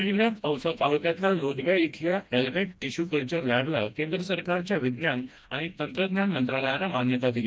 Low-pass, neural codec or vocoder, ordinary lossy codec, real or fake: none; codec, 16 kHz, 1 kbps, FreqCodec, smaller model; none; fake